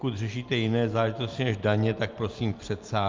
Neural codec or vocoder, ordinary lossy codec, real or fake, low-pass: none; Opus, 32 kbps; real; 7.2 kHz